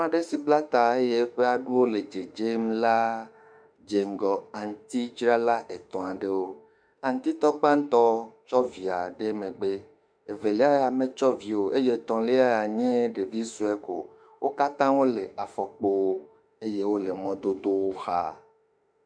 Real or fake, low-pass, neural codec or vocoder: fake; 9.9 kHz; autoencoder, 48 kHz, 32 numbers a frame, DAC-VAE, trained on Japanese speech